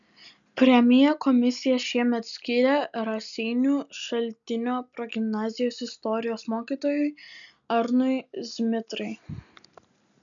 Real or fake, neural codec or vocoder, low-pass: real; none; 7.2 kHz